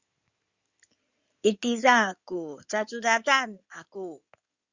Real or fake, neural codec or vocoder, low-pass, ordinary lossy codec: fake; codec, 16 kHz in and 24 kHz out, 2.2 kbps, FireRedTTS-2 codec; 7.2 kHz; Opus, 64 kbps